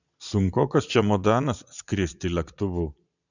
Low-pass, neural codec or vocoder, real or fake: 7.2 kHz; vocoder, 22.05 kHz, 80 mel bands, Vocos; fake